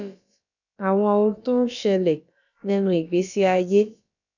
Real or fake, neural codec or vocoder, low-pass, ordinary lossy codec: fake; codec, 16 kHz, about 1 kbps, DyCAST, with the encoder's durations; 7.2 kHz; none